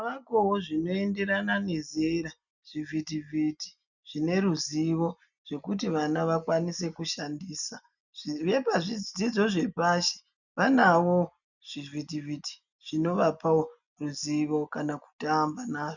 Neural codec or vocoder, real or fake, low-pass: none; real; 7.2 kHz